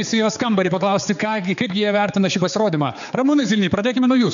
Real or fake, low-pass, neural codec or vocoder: fake; 7.2 kHz; codec, 16 kHz, 4 kbps, X-Codec, HuBERT features, trained on general audio